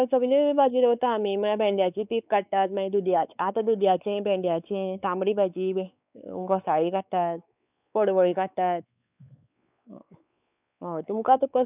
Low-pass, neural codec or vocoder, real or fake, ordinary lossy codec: 3.6 kHz; codec, 16 kHz, 4 kbps, X-Codec, WavLM features, trained on Multilingual LibriSpeech; fake; none